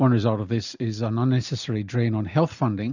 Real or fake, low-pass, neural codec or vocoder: real; 7.2 kHz; none